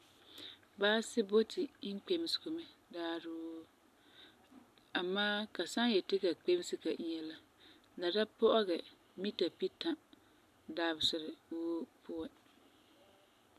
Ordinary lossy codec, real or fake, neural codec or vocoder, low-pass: MP3, 96 kbps; real; none; 14.4 kHz